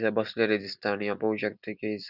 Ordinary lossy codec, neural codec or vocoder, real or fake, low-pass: none; none; real; 5.4 kHz